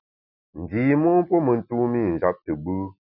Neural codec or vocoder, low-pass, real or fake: none; 5.4 kHz; real